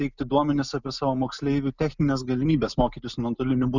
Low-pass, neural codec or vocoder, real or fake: 7.2 kHz; none; real